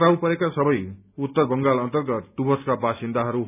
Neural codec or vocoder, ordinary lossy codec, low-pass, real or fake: none; none; 3.6 kHz; real